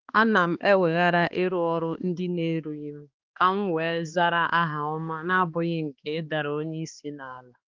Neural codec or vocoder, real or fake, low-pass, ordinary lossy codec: codec, 16 kHz, 2 kbps, X-Codec, HuBERT features, trained on balanced general audio; fake; 7.2 kHz; Opus, 24 kbps